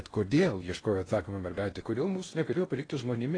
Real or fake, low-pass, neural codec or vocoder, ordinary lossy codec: fake; 9.9 kHz; codec, 16 kHz in and 24 kHz out, 0.6 kbps, FocalCodec, streaming, 2048 codes; AAC, 32 kbps